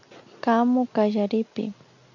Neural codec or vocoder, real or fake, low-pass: none; real; 7.2 kHz